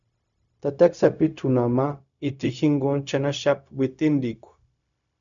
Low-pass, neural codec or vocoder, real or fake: 7.2 kHz; codec, 16 kHz, 0.4 kbps, LongCat-Audio-Codec; fake